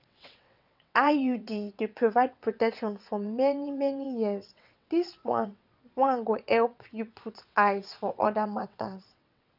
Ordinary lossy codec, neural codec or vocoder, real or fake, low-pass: none; vocoder, 22.05 kHz, 80 mel bands, WaveNeXt; fake; 5.4 kHz